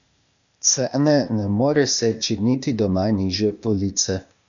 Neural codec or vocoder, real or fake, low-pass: codec, 16 kHz, 0.8 kbps, ZipCodec; fake; 7.2 kHz